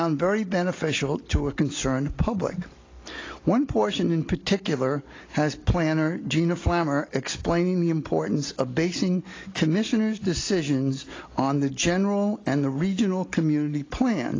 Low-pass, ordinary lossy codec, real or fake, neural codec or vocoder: 7.2 kHz; AAC, 32 kbps; real; none